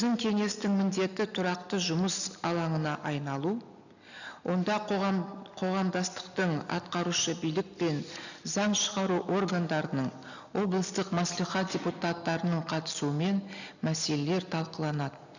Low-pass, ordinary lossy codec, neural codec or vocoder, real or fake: 7.2 kHz; none; none; real